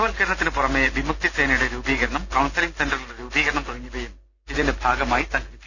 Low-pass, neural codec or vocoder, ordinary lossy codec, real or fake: 7.2 kHz; none; none; real